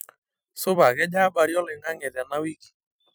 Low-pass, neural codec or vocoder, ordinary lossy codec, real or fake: none; vocoder, 44.1 kHz, 128 mel bands every 256 samples, BigVGAN v2; none; fake